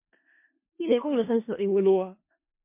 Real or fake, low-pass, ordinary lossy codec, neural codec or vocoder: fake; 3.6 kHz; MP3, 24 kbps; codec, 16 kHz in and 24 kHz out, 0.4 kbps, LongCat-Audio-Codec, four codebook decoder